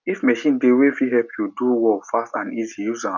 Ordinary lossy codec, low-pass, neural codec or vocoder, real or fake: none; 7.2 kHz; none; real